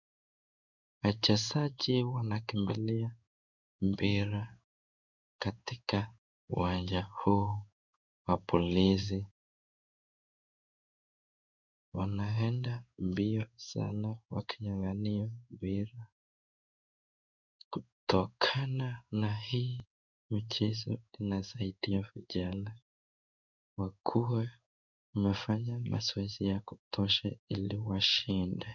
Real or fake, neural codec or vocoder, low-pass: fake; codec, 16 kHz in and 24 kHz out, 1 kbps, XY-Tokenizer; 7.2 kHz